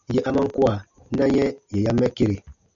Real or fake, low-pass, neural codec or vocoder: real; 7.2 kHz; none